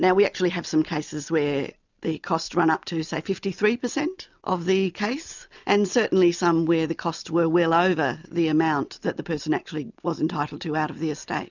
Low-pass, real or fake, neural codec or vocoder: 7.2 kHz; real; none